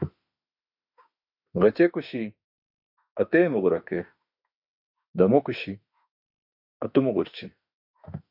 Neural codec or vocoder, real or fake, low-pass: autoencoder, 48 kHz, 32 numbers a frame, DAC-VAE, trained on Japanese speech; fake; 5.4 kHz